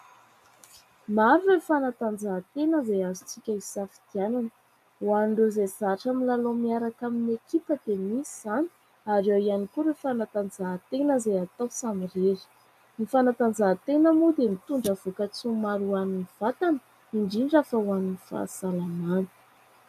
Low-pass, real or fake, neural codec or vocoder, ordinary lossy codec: 14.4 kHz; real; none; AAC, 96 kbps